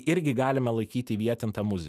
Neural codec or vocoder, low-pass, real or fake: autoencoder, 48 kHz, 128 numbers a frame, DAC-VAE, trained on Japanese speech; 14.4 kHz; fake